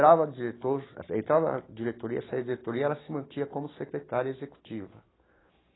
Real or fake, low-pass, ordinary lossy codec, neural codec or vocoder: real; 7.2 kHz; AAC, 16 kbps; none